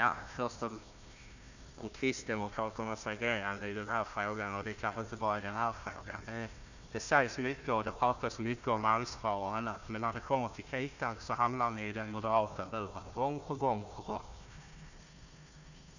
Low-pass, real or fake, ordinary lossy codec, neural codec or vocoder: 7.2 kHz; fake; none; codec, 16 kHz, 1 kbps, FunCodec, trained on Chinese and English, 50 frames a second